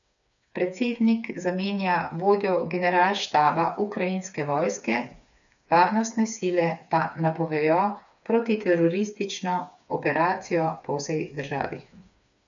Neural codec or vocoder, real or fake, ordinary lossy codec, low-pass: codec, 16 kHz, 4 kbps, FreqCodec, smaller model; fake; none; 7.2 kHz